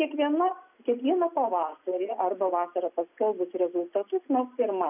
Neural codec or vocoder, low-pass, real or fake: none; 3.6 kHz; real